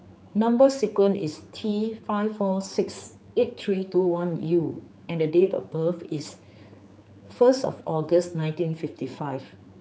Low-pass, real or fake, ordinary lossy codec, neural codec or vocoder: none; fake; none; codec, 16 kHz, 4 kbps, X-Codec, HuBERT features, trained on general audio